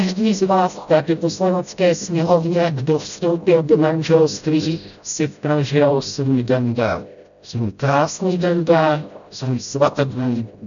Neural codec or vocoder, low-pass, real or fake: codec, 16 kHz, 0.5 kbps, FreqCodec, smaller model; 7.2 kHz; fake